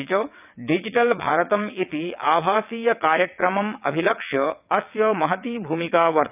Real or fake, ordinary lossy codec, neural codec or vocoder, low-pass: fake; none; vocoder, 22.05 kHz, 80 mel bands, WaveNeXt; 3.6 kHz